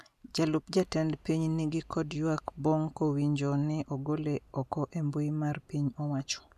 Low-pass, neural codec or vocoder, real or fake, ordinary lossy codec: 14.4 kHz; none; real; none